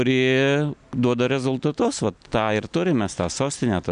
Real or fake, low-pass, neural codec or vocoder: real; 9.9 kHz; none